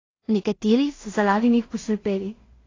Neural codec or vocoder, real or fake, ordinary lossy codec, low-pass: codec, 16 kHz in and 24 kHz out, 0.4 kbps, LongCat-Audio-Codec, two codebook decoder; fake; AAC, 32 kbps; 7.2 kHz